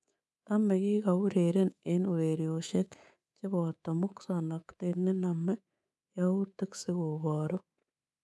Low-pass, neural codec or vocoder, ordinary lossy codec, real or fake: none; codec, 24 kHz, 3.1 kbps, DualCodec; none; fake